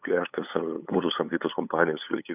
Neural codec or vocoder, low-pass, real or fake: codec, 16 kHz, 8 kbps, FunCodec, trained on LibriTTS, 25 frames a second; 3.6 kHz; fake